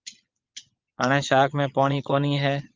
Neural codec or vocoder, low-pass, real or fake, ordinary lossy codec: vocoder, 22.05 kHz, 80 mel bands, Vocos; 7.2 kHz; fake; Opus, 24 kbps